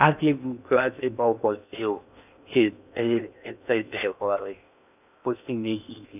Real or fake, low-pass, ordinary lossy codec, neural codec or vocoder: fake; 3.6 kHz; none; codec, 16 kHz in and 24 kHz out, 0.6 kbps, FocalCodec, streaming, 2048 codes